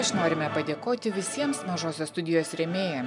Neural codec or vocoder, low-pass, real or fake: none; 10.8 kHz; real